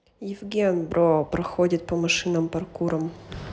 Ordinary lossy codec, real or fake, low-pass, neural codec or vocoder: none; real; none; none